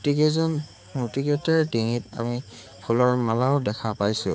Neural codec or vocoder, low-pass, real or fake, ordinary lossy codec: codec, 16 kHz, 4 kbps, X-Codec, HuBERT features, trained on balanced general audio; none; fake; none